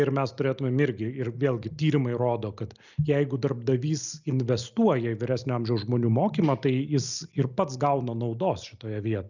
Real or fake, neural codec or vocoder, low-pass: real; none; 7.2 kHz